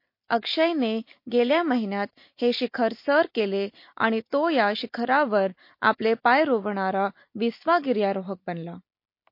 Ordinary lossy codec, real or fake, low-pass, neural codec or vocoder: MP3, 32 kbps; real; 5.4 kHz; none